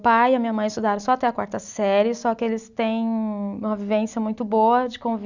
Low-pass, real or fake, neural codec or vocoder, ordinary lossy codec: 7.2 kHz; real; none; none